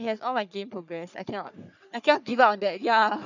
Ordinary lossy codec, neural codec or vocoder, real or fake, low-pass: none; codec, 44.1 kHz, 3.4 kbps, Pupu-Codec; fake; 7.2 kHz